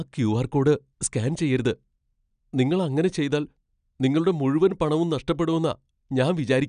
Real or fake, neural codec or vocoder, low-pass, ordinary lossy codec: real; none; 9.9 kHz; none